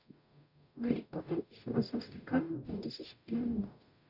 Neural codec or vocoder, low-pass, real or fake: codec, 44.1 kHz, 0.9 kbps, DAC; 5.4 kHz; fake